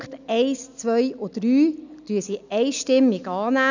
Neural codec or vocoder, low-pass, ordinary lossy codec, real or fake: none; 7.2 kHz; none; real